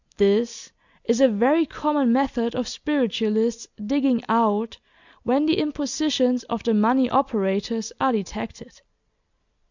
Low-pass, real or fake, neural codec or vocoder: 7.2 kHz; real; none